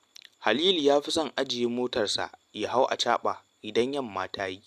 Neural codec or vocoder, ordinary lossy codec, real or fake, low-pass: none; AAC, 96 kbps; real; 14.4 kHz